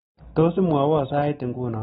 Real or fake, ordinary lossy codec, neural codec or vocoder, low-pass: real; AAC, 16 kbps; none; 19.8 kHz